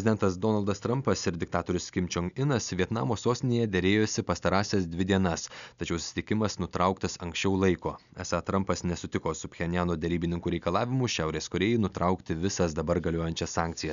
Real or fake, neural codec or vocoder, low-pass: real; none; 7.2 kHz